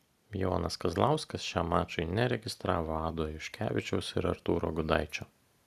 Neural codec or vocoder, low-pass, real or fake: none; 14.4 kHz; real